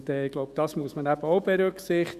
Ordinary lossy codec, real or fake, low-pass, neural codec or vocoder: Opus, 64 kbps; fake; 14.4 kHz; autoencoder, 48 kHz, 128 numbers a frame, DAC-VAE, trained on Japanese speech